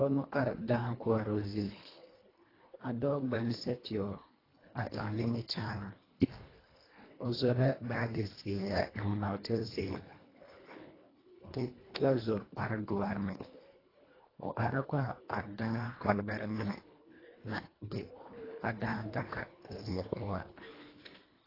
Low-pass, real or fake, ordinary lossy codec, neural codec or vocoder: 5.4 kHz; fake; AAC, 24 kbps; codec, 24 kHz, 1.5 kbps, HILCodec